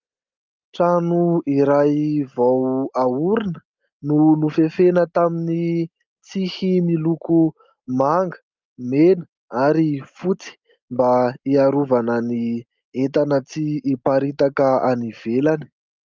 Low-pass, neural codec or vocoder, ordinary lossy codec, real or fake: 7.2 kHz; none; Opus, 24 kbps; real